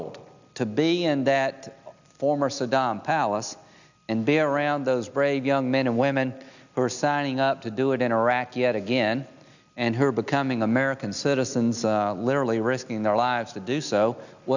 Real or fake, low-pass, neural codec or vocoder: real; 7.2 kHz; none